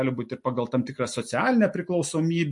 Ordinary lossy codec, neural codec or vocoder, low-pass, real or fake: MP3, 48 kbps; none; 10.8 kHz; real